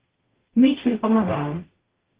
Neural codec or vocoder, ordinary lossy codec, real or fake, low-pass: codec, 44.1 kHz, 0.9 kbps, DAC; Opus, 16 kbps; fake; 3.6 kHz